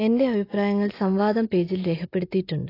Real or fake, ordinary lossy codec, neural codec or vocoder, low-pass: real; AAC, 24 kbps; none; 5.4 kHz